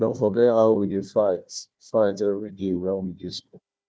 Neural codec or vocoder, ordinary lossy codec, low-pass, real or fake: codec, 16 kHz, 1 kbps, FunCodec, trained on Chinese and English, 50 frames a second; none; none; fake